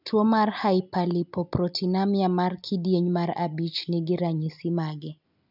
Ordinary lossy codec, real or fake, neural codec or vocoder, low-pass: none; real; none; 5.4 kHz